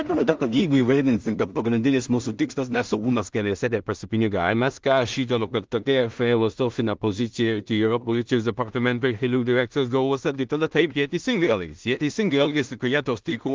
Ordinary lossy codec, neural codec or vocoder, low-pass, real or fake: Opus, 32 kbps; codec, 16 kHz in and 24 kHz out, 0.4 kbps, LongCat-Audio-Codec, two codebook decoder; 7.2 kHz; fake